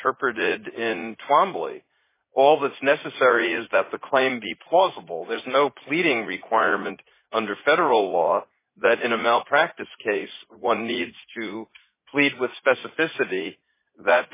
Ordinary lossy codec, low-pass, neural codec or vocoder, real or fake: MP3, 16 kbps; 3.6 kHz; vocoder, 44.1 kHz, 80 mel bands, Vocos; fake